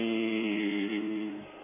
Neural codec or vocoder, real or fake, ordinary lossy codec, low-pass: codec, 16 kHz in and 24 kHz out, 2.2 kbps, FireRedTTS-2 codec; fake; none; 3.6 kHz